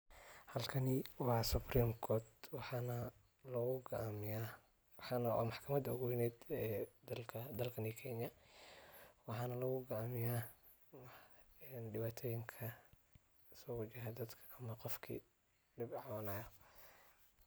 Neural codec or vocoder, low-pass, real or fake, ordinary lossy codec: none; none; real; none